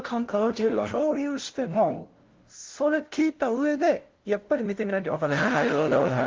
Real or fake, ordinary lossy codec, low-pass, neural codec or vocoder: fake; Opus, 16 kbps; 7.2 kHz; codec, 16 kHz, 0.5 kbps, FunCodec, trained on LibriTTS, 25 frames a second